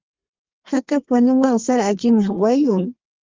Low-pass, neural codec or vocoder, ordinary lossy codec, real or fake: 7.2 kHz; codec, 32 kHz, 1.9 kbps, SNAC; Opus, 32 kbps; fake